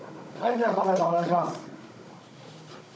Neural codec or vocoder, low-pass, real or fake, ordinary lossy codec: codec, 16 kHz, 16 kbps, FunCodec, trained on Chinese and English, 50 frames a second; none; fake; none